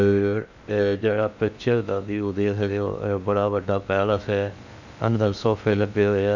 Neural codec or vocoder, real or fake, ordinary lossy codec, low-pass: codec, 16 kHz in and 24 kHz out, 0.6 kbps, FocalCodec, streaming, 2048 codes; fake; none; 7.2 kHz